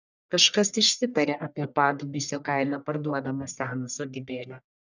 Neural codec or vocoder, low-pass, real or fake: codec, 44.1 kHz, 1.7 kbps, Pupu-Codec; 7.2 kHz; fake